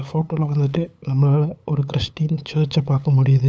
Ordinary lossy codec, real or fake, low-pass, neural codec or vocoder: none; fake; none; codec, 16 kHz, 8 kbps, FunCodec, trained on LibriTTS, 25 frames a second